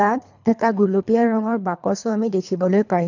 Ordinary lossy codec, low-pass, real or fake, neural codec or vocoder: none; 7.2 kHz; fake; codec, 24 kHz, 3 kbps, HILCodec